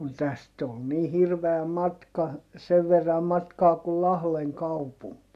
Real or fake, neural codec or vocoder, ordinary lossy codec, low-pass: real; none; AAC, 96 kbps; 14.4 kHz